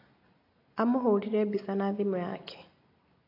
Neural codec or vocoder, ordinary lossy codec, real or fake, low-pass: none; AAC, 32 kbps; real; 5.4 kHz